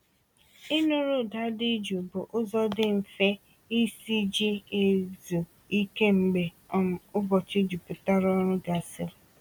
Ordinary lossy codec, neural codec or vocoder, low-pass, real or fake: MP3, 96 kbps; none; 19.8 kHz; real